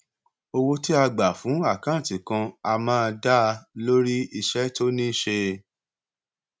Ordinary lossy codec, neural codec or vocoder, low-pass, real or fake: none; none; none; real